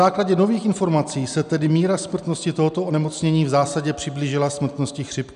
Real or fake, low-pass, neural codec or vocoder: real; 10.8 kHz; none